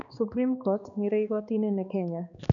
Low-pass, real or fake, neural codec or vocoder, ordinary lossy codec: 7.2 kHz; fake; codec, 16 kHz, 2 kbps, X-Codec, HuBERT features, trained on balanced general audio; none